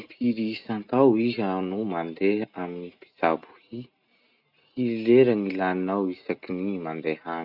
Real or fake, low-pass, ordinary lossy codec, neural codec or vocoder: real; 5.4 kHz; none; none